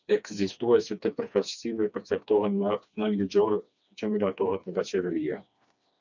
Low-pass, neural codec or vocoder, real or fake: 7.2 kHz; codec, 16 kHz, 2 kbps, FreqCodec, smaller model; fake